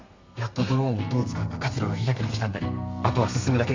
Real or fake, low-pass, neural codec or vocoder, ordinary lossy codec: fake; 7.2 kHz; codec, 32 kHz, 1.9 kbps, SNAC; MP3, 48 kbps